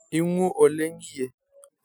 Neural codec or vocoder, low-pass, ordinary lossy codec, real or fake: none; none; none; real